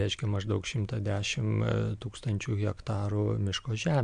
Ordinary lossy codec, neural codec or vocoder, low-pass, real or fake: MP3, 64 kbps; none; 9.9 kHz; real